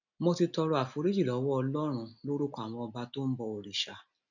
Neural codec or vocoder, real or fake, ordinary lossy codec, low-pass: none; real; none; 7.2 kHz